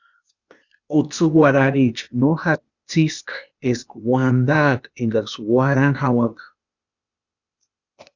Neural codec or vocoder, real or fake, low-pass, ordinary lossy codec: codec, 16 kHz, 0.8 kbps, ZipCodec; fake; 7.2 kHz; Opus, 64 kbps